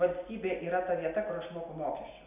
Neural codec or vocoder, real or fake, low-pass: none; real; 3.6 kHz